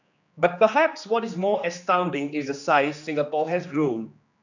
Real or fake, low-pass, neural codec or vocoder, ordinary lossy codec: fake; 7.2 kHz; codec, 16 kHz, 2 kbps, X-Codec, HuBERT features, trained on general audio; none